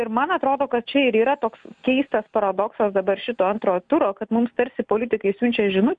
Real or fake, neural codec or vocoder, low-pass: real; none; 9.9 kHz